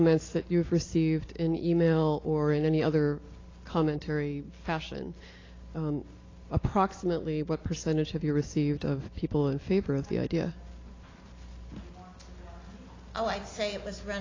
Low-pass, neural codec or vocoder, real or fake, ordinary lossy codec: 7.2 kHz; none; real; AAC, 32 kbps